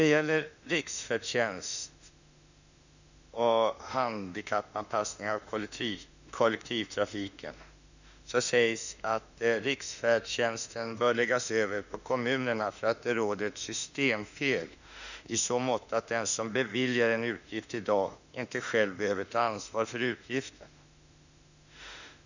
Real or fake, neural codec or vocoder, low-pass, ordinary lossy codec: fake; autoencoder, 48 kHz, 32 numbers a frame, DAC-VAE, trained on Japanese speech; 7.2 kHz; none